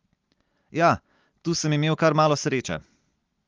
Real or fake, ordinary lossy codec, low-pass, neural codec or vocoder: real; Opus, 32 kbps; 7.2 kHz; none